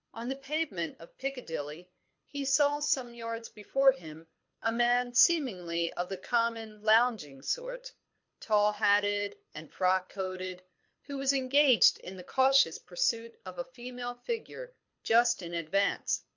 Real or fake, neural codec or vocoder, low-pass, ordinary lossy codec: fake; codec, 24 kHz, 6 kbps, HILCodec; 7.2 kHz; MP3, 48 kbps